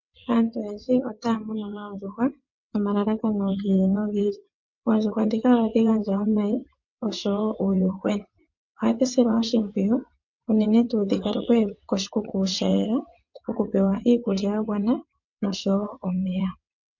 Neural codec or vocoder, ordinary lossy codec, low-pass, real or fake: vocoder, 22.05 kHz, 80 mel bands, WaveNeXt; MP3, 48 kbps; 7.2 kHz; fake